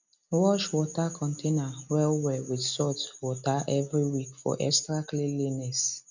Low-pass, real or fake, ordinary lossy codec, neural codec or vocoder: 7.2 kHz; real; none; none